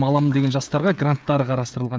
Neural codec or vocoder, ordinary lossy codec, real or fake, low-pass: codec, 16 kHz, 16 kbps, FreqCodec, smaller model; none; fake; none